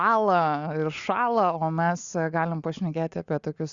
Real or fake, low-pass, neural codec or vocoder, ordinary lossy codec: real; 7.2 kHz; none; Opus, 64 kbps